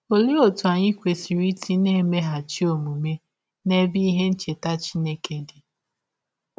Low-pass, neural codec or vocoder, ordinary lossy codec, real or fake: none; none; none; real